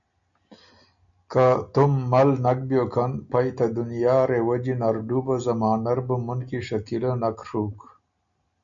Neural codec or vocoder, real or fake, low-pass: none; real; 7.2 kHz